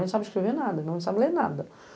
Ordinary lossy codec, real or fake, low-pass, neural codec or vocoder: none; real; none; none